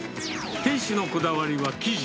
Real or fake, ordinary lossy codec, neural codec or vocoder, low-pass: real; none; none; none